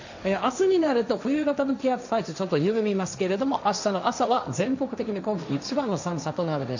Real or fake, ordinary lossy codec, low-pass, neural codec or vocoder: fake; none; 7.2 kHz; codec, 16 kHz, 1.1 kbps, Voila-Tokenizer